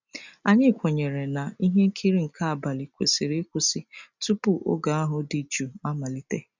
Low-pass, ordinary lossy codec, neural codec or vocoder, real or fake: 7.2 kHz; none; none; real